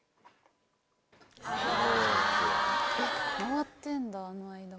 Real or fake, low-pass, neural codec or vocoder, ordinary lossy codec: real; none; none; none